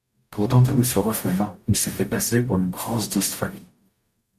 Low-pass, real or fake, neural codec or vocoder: 14.4 kHz; fake; codec, 44.1 kHz, 0.9 kbps, DAC